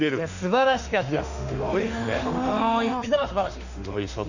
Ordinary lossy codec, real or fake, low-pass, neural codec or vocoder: none; fake; 7.2 kHz; autoencoder, 48 kHz, 32 numbers a frame, DAC-VAE, trained on Japanese speech